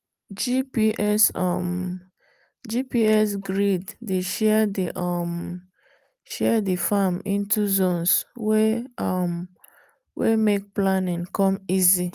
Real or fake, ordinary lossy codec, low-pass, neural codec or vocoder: real; Opus, 32 kbps; 14.4 kHz; none